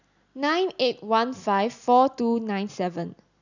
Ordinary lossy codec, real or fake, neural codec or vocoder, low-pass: none; real; none; 7.2 kHz